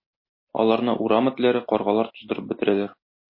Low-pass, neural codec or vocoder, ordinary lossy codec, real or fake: 5.4 kHz; none; MP3, 24 kbps; real